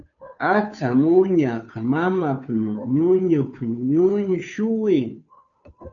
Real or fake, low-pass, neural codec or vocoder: fake; 7.2 kHz; codec, 16 kHz, 2 kbps, FunCodec, trained on Chinese and English, 25 frames a second